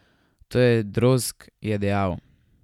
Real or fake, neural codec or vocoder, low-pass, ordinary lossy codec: real; none; 19.8 kHz; none